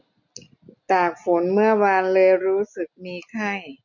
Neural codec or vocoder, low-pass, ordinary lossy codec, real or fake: none; 7.2 kHz; none; real